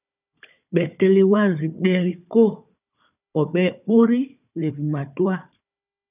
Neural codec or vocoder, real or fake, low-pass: codec, 16 kHz, 4 kbps, FunCodec, trained on Chinese and English, 50 frames a second; fake; 3.6 kHz